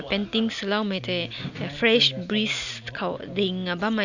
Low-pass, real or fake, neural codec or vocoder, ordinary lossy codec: 7.2 kHz; real; none; none